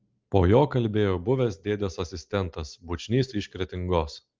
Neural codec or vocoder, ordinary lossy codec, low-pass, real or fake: none; Opus, 32 kbps; 7.2 kHz; real